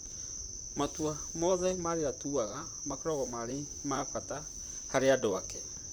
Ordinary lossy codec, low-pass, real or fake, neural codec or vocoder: none; none; fake; vocoder, 44.1 kHz, 128 mel bands, Pupu-Vocoder